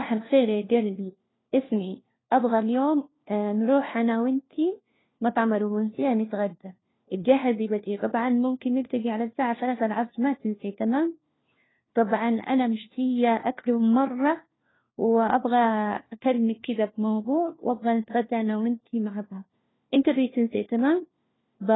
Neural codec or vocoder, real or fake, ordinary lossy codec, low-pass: codec, 16 kHz, 1 kbps, FunCodec, trained on LibriTTS, 50 frames a second; fake; AAC, 16 kbps; 7.2 kHz